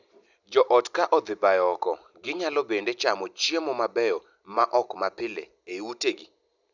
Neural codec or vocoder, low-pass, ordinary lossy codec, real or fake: none; 7.2 kHz; none; real